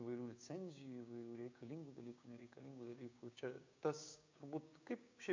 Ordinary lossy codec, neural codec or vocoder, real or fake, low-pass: MP3, 48 kbps; codec, 16 kHz, 6 kbps, DAC; fake; 7.2 kHz